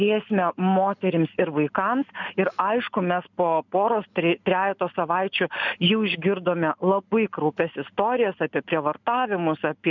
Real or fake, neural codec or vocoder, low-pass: real; none; 7.2 kHz